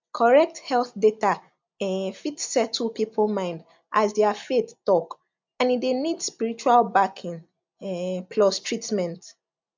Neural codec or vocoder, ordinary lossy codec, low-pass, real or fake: none; MP3, 64 kbps; 7.2 kHz; real